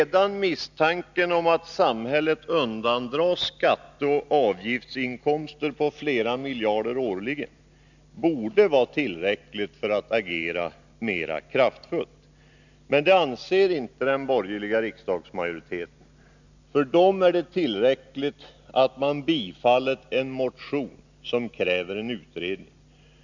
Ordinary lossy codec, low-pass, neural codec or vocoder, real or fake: none; 7.2 kHz; none; real